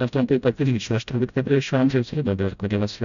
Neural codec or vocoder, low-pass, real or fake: codec, 16 kHz, 0.5 kbps, FreqCodec, smaller model; 7.2 kHz; fake